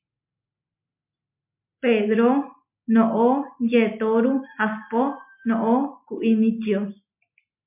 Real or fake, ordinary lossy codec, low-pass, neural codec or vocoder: real; MP3, 32 kbps; 3.6 kHz; none